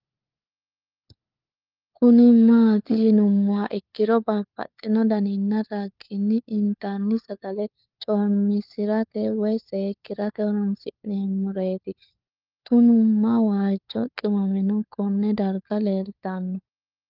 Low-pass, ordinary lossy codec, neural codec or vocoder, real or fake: 5.4 kHz; Opus, 24 kbps; codec, 16 kHz, 4 kbps, FunCodec, trained on LibriTTS, 50 frames a second; fake